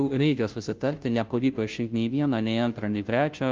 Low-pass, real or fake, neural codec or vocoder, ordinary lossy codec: 7.2 kHz; fake; codec, 16 kHz, 0.5 kbps, FunCodec, trained on Chinese and English, 25 frames a second; Opus, 32 kbps